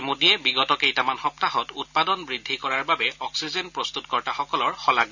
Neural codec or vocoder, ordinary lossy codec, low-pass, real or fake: none; none; 7.2 kHz; real